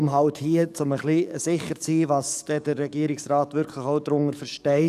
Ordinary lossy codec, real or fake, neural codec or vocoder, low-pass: none; fake; codec, 44.1 kHz, 7.8 kbps, DAC; 14.4 kHz